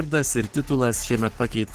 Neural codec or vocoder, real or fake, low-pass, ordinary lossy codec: codec, 44.1 kHz, 3.4 kbps, Pupu-Codec; fake; 14.4 kHz; Opus, 16 kbps